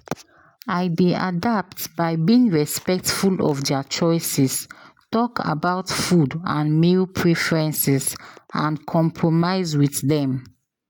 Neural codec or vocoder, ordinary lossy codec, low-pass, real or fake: none; none; none; real